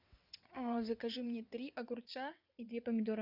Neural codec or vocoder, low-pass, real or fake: vocoder, 22.05 kHz, 80 mel bands, WaveNeXt; 5.4 kHz; fake